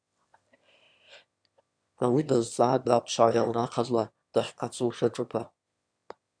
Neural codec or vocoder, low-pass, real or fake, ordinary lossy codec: autoencoder, 22.05 kHz, a latent of 192 numbers a frame, VITS, trained on one speaker; 9.9 kHz; fake; MP3, 96 kbps